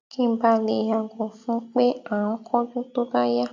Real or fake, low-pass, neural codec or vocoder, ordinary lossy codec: real; 7.2 kHz; none; AAC, 48 kbps